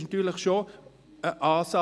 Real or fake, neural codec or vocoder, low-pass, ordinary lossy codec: real; none; none; none